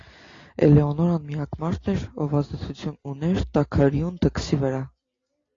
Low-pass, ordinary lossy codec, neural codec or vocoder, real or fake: 7.2 kHz; AAC, 32 kbps; none; real